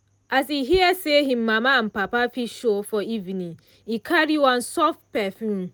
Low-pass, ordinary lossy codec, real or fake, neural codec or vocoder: none; none; real; none